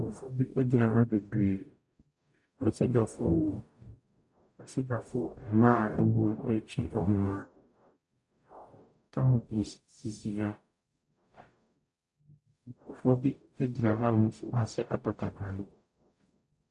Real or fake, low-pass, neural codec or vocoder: fake; 10.8 kHz; codec, 44.1 kHz, 0.9 kbps, DAC